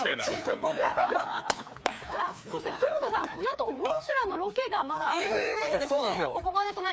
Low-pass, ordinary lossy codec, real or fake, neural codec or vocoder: none; none; fake; codec, 16 kHz, 2 kbps, FreqCodec, larger model